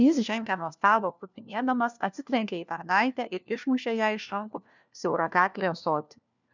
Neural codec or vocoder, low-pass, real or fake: codec, 16 kHz, 1 kbps, FunCodec, trained on LibriTTS, 50 frames a second; 7.2 kHz; fake